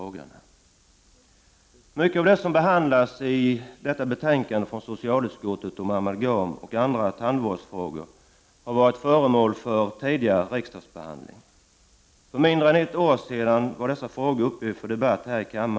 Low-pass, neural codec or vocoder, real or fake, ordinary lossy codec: none; none; real; none